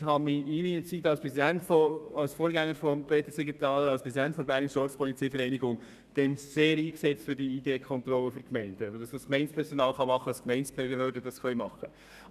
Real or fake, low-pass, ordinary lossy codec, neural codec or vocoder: fake; 14.4 kHz; none; codec, 32 kHz, 1.9 kbps, SNAC